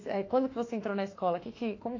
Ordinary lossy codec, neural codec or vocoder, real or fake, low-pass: AAC, 32 kbps; autoencoder, 48 kHz, 32 numbers a frame, DAC-VAE, trained on Japanese speech; fake; 7.2 kHz